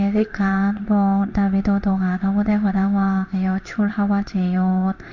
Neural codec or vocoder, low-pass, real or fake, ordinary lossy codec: codec, 16 kHz in and 24 kHz out, 1 kbps, XY-Tokenizer; 7.2 kHz; fake; none